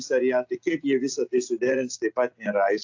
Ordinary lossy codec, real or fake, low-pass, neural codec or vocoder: AAC, 48 kbps; real; 7.2 kHz; none